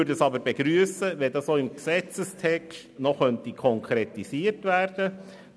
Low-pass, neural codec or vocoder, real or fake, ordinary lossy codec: none; none; real; none